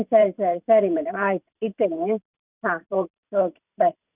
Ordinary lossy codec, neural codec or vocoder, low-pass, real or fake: none; vocoder, 44.1 kHz, 128 mel bands every 512 samples, BigVGAN v2; 3.6 kHz; fake